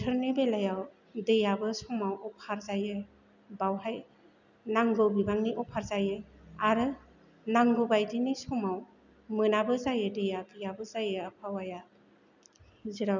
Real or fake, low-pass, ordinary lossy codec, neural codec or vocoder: real; 7.2 kHz; none; none